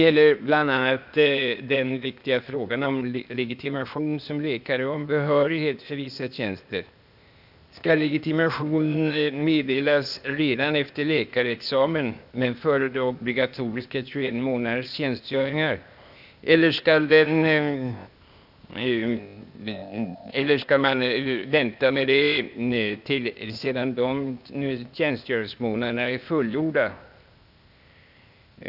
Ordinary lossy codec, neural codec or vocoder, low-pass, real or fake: none; codec, 16 kHz, 0.8 kbps, ZipCodec; 5.4 kHz; fake